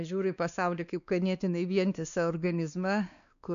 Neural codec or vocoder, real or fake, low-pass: codec, 16 kHz, 2 kbps, X-Codec, WavLM features, trained on Multilingual LibriSpeech; fake; 7.2 kHz